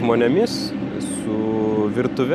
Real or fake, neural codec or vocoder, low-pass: real; none; 14.4 kHz